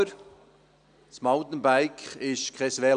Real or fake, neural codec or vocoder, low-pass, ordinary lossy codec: real; none; 9.9 kHz; none